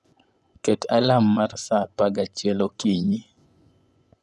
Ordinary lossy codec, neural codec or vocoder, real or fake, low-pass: none; vocoder, 24 kHz, 100 mel bands, Vocos; fake; none